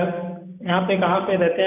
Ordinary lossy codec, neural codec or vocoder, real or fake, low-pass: none; none; real; 3.6 kHz